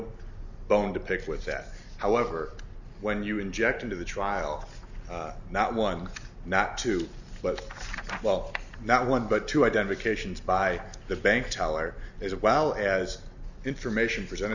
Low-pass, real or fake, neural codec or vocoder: 7.2 kHz; real; none